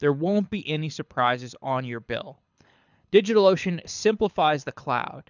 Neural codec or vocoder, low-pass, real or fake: codec, 24 kHz, 6 kbps, HILCodec; 7.2 kHz; fake